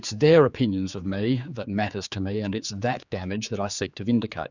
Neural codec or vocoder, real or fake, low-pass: codec, 16 kHz, 4 kbps, X-Codec, HuBERT features, trained on general audio; fake; 7.2 kHz